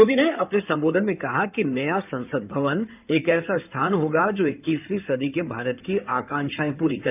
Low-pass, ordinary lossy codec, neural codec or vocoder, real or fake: 3.6 kHz; none; codec, 16 kHz in and 24 kHz out, 2.2 kbps, FireRedTTS-2 codec; fake